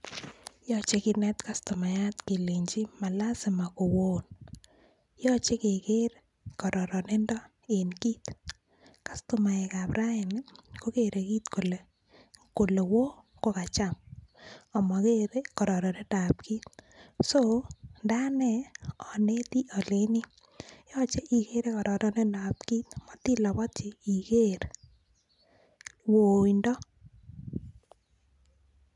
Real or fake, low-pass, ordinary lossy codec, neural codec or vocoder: real; 10.8 kHz; none; none